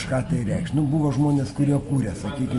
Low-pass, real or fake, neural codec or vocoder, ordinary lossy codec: 14.4 kHz; real; none; MP3, 48 kbps